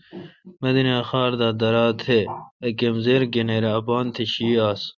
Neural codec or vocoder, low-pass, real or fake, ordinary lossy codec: none; 7.2 kHz; real; Opus, 64 kbps